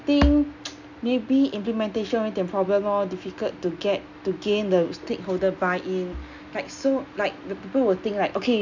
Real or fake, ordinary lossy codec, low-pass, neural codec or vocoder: real; none; 7.2 kHz; none